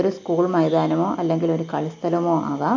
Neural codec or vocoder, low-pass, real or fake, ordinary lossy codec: none; 7.2 kHz; real; MP3, 48 kbps